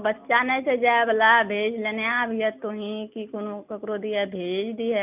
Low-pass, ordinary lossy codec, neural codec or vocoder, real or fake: 3.6 kHz; none; none; real